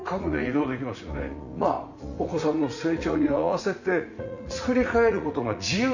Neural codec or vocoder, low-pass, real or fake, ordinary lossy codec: vocoder, 44.1 kHz, 80 mel bands, Vocos; 7.2 kHz; fake; none